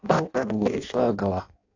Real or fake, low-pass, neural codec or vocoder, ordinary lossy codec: fake; 7.2 kHz; codec, 16 kHz in and 24 kHz out, 0.6 kbps, FireRedTTS-2 codec; AAC, 48 kbps